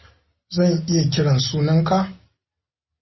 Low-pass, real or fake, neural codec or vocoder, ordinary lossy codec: 7.2 kHz; real; none; MP3, 24 kbps